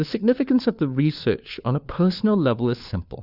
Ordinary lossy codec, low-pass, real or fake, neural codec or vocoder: Opus, 64 kbps; 5.4 kHz; fake; codec, 16 kHz, 4 kbps, FunCodec, trained on LibriTTS, 50 frames a second